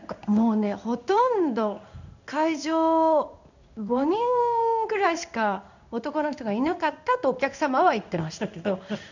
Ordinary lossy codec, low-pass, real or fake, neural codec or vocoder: none; 7.2 kHz; fake; codec, 16 kHz in and 24 kHz out, 1 kbps, XY-Tokenizer